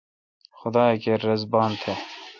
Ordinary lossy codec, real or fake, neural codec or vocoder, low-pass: MP3, 64 kbps; real; none; 7.2 kHz